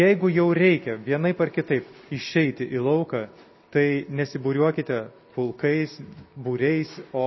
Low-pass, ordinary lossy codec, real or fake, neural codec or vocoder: 7.2 kHz; MP3, 24 kbps; real; none